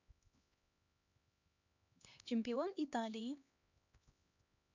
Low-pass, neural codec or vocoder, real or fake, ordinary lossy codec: 7.2 kHz; codec, 16 kHz, 2 kbps, X-Codec, HuBERT features, trained on LibriSpeech; fake; none